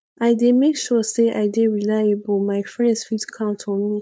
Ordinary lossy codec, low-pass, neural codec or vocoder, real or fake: none; none; codec, 16 kHz, 4.8 kbps, FACodec; fake